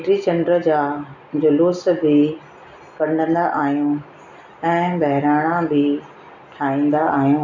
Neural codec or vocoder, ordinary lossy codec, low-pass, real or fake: none; none; 7.2 kHz; real